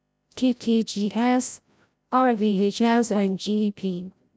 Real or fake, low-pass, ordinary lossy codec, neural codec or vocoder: fake; none; none; codec, 16 kHz, 0.5 kbps, FreqCodec, larger model